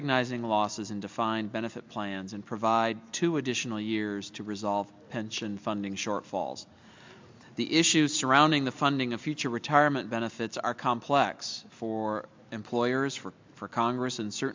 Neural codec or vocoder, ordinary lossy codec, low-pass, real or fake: none; MP3, 64 kbps; 7.2 kHz; real